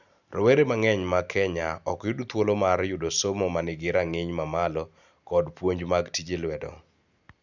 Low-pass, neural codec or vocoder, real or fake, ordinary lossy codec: 7.2 kHz; none; real; none